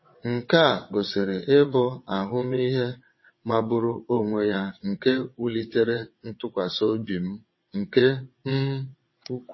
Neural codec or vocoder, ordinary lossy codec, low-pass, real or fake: vocoder, 22.05 kHz, 80 mel bands, WaveNeXt; MP3, 24 kbps; 7.2 kHz; fake